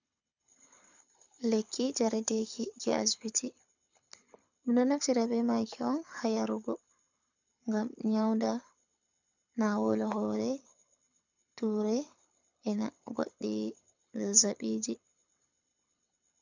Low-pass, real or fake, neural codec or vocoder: 7.2 kHz; fake; codec, 24 kHz, 6 kbps, HILCodec